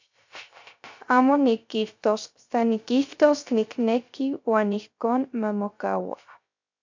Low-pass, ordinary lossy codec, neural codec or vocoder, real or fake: 7.2 kHz; MP3, 64 kbps; codec, 16 kHz, 0.3 kbps, FocalCodec; fake